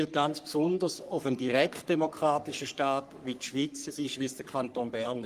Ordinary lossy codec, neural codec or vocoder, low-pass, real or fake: Opus, 32 kbps; codec, 44.1 kHz, 3.4 kbps, Pupu-Codec; 14.4 kHz; fake